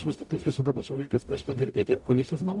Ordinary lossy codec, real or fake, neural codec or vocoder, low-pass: AAC, 64 kbps; fake; codec, 44.1 kHz, 0.9 kbps, DAC; 10.8 kHz